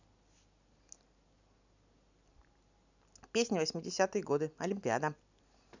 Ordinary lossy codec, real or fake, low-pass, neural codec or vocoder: none; real; 7.2 kHz; none